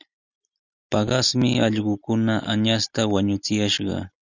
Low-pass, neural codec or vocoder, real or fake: 7.2 kHz; none; real